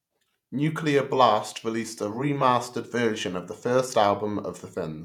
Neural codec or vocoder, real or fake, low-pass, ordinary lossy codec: vocoder, 48 kHz, 128 mel bands, Vocos; fake; 19.8 kHz; none